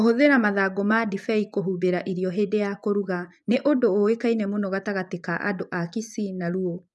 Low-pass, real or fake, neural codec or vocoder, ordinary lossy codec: none; fake; vocoder, 24 kHz, 100 mel bands, Vocos; none